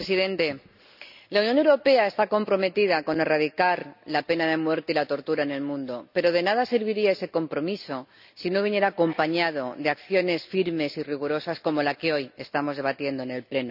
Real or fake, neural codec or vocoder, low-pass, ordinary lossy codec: real; none; 5.4 kHz; none